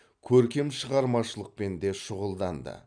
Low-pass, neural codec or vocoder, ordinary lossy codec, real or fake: 9.9 kHz; none; none; real